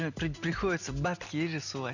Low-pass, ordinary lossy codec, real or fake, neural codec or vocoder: 7.2 kHz; none; real; none